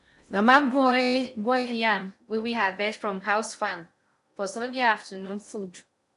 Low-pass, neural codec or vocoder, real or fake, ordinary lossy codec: 10.8 kHz; codec, 16 kHz in and 24 kHz out, 0.6 kbps, FocalCodec, streaming, 4096 codes; fake; none